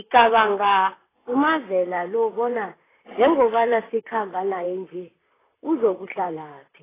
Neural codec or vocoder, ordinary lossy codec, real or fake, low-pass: vocoder, 44.1 kHz, 128 mel bands, Pupu-Vocoder; AAC, 16 kbps; fake; 3.6 kHz